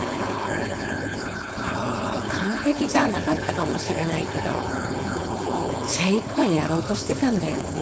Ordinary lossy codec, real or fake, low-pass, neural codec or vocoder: none; fake; none; codec, 16 kHz, 4.8 kbps, FACodec